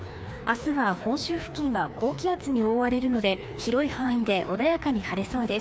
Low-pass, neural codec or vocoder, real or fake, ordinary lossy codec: none; codec, 16 kHz, 2 kbps, FreqCodec, larger model; fake; none